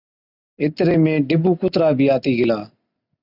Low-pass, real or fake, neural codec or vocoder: 5.4 kHz; real; none